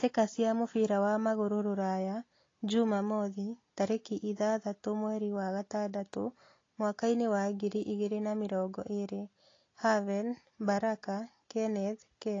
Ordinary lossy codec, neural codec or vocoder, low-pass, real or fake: AAC, 32 kbps; none; 7.2 kHz; real